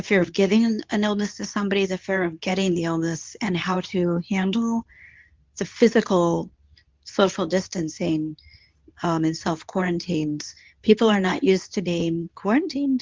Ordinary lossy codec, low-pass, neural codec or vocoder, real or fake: Opus, 24 kbps; 7.2 kHz; codec, 24 kHz, 0.9 kbps, WavTokenizer, medium speech release version 2; fake